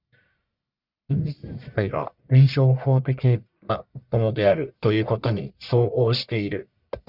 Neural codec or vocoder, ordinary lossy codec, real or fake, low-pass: codec, 44.1 kHz, 1.7 kbps, Pupu-Codec; Opus, 64 kbps; fake; 5.4 kHz